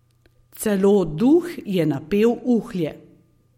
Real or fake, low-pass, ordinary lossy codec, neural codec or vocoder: real; 19.8 kHz; MP3, 64 kbps; none